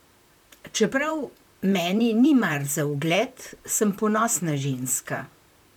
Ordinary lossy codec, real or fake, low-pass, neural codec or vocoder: none; fake; 19.8 kHz; vocoder, 44.1 kHz, 128 mel bands, Pupu-Vocoder